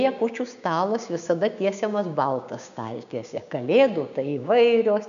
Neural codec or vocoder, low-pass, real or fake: codec, 16 kHz, 6 kbps, DAC; 7.2 kHz; fake